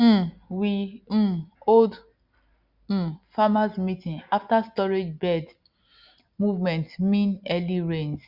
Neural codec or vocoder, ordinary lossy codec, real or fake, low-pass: none; Opus, 64 kbps; real; 5.4 kHz